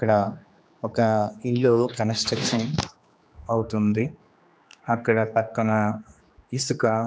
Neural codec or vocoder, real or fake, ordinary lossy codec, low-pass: codec, 16 kHz, 2 kbps, X-Codec, HuBERT features, trained on general audio; fake; none; none